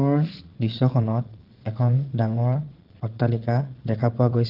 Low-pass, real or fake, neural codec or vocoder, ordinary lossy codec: 5.4 kHz; fake; vocoder, 22.05 kHz, 80 mel bands, Vocos; Opus, 16 kbps